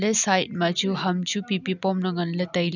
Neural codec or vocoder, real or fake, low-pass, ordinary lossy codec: none; real; 7.2 kHz; none